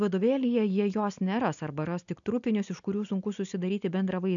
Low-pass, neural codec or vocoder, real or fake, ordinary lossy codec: 7.2 kHz; none; real; MP3, 96 kbps